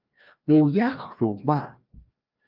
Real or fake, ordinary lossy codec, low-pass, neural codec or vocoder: fake; Opus, 24 kbps; 5.4 kHz; codec, 16 kHz, 1 kbps, FreqCodec, larger model